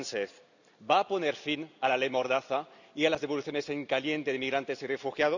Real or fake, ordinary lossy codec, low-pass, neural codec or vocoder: real; none; 7.2 kHz; none